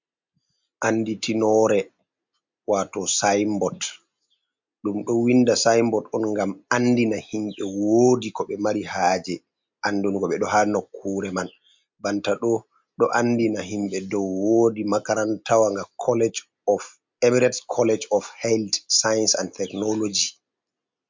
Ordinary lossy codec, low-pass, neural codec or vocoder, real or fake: MP3, 64 kbps; 7.2 kHz; none; real